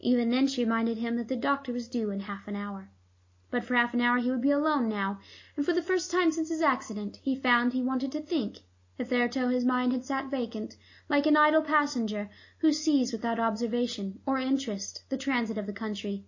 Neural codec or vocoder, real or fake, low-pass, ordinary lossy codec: none; real; 7.2 kHz; MP3, 32 kbps